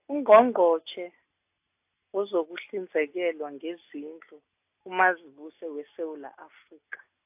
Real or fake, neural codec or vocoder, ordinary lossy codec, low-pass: real; none; AAC, 32 kbps; 3.6 kHz